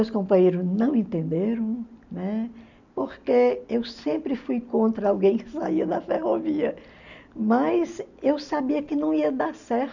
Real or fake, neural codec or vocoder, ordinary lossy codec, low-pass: real; none; none; 7.2 kHz